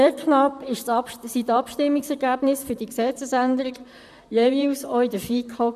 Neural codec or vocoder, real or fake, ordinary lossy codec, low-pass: vocoder, 44.1 kHz, 128 mel bands, Pupu-Vocoder; fake; none; 14.4 kHz